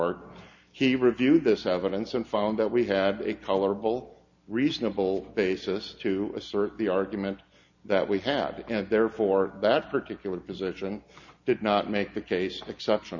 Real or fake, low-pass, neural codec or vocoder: real; 7.2 kHz; none